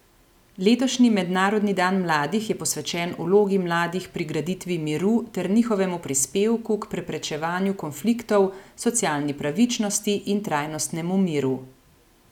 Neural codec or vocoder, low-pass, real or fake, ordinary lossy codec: none; 19.8 kHz; real; none